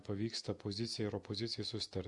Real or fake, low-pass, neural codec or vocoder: real; 10.8 kHz; none